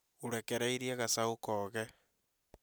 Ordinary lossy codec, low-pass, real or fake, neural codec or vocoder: none; none; real; none